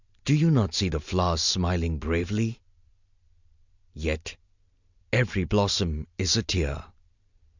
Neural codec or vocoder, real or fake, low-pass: none; real; 7.2 kHz